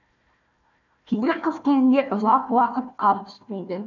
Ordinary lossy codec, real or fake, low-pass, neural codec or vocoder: none; fake; 7.2 kHz; codec, 16 kHz, 1 kbps, FunCodec, trained on Chinese and English, 50 frames a second